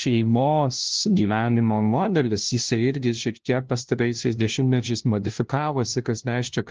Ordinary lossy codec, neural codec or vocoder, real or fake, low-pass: Opus, 16 kbps; codec, 16 kHz, 0.5 kbps, FunCodec, trained on LibriTTS, 25 frames a second; fake; 7.2 kHz